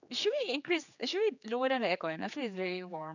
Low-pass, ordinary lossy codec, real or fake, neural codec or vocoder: 7.2 kHz; none; fake; codec, 16 kHz, 4 kbps, X-Codec, HuBERT features, trained on general audio